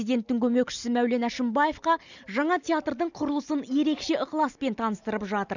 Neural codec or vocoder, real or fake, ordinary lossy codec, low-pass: none; real; none; 7.2 kHz